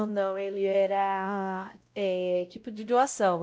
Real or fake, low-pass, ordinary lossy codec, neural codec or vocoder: fake; none; none; codec, 16 kHz, 0.5 kbps, X-Codec, WavLM features, trained on Multilingual LibriSpeech